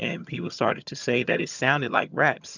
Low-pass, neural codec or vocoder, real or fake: 7.2 kHz; vocoder, 22.05 kHz, 80 mel bands, HiFi-GAN; fake